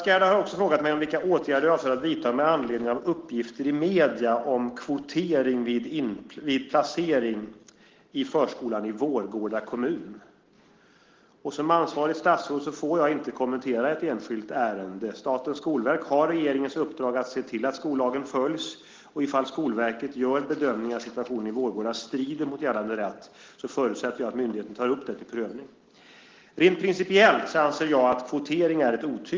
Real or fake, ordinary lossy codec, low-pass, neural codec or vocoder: real; Opus, 16 kbps; 7.2 kHz; none